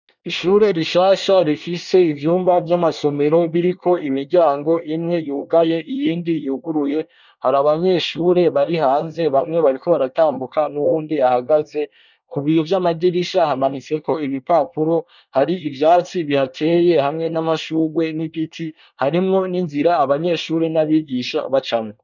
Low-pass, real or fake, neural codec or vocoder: 7.2 kHz; fake; codec, 24 kHz, 1 kbps, SNAC